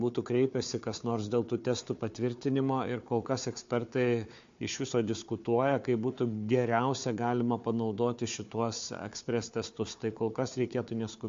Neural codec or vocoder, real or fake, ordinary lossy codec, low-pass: codec, 16 kHz, 4 kbps, FunCodec, trained on Chinese and English, 50 frames a second; fake; MP3, 48 kbps; 7.2 kHz